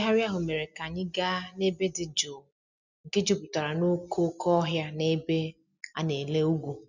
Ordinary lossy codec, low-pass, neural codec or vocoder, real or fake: none; 7.2 kHz; none; real